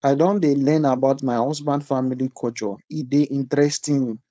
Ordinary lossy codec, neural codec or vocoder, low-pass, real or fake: none; codec, 16 kHz, 4.8 kbps, FACodec; none; fake